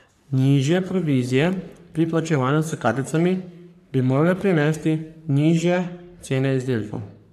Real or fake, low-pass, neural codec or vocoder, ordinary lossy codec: fake; 14.4 kHz; codec, 44.1 kHz, 3.4 kbps, Pupu-Codec; MP3, 96 kbps